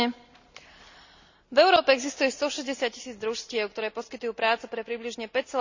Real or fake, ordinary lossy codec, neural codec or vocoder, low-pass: real; Opus, 64 kbps; none; 7.2 kHz